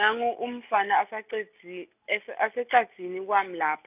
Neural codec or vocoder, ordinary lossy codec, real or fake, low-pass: none; none; real; 3.6 kHz